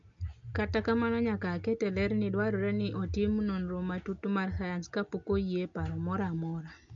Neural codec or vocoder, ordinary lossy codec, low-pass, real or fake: none; AAC, 64 kbps; 7.2 kHz; real